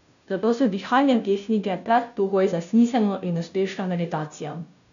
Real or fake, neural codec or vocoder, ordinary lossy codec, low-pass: fake; codec, 16 kHz, 0.5 kbps, FunCodec, trained on Chinese and English, 25 frames a second; none; 7.2 kHz